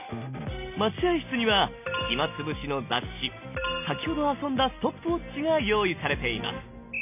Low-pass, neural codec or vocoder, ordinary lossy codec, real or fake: 3.6 kHz; none; none; real